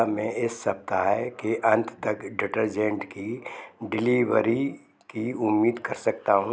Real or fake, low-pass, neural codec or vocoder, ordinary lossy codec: real; none; none; none